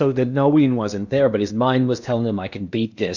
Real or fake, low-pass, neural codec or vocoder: fake; 7.2 kHz; codec, 16 kHz in and 24 kHz out, 0.8 kbps, FocalCodec, streaming, 65536 codes